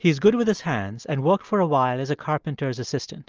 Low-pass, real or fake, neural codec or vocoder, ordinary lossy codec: 7.2 kHz; real; none; Opus, 24 kbps